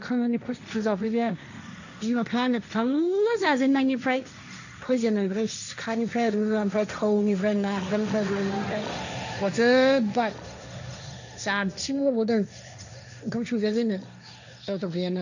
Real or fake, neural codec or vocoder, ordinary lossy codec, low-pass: fake; codec, 16 kHz, 1.1 kbps, Voila-Tokenizer; none; 7.2 kHz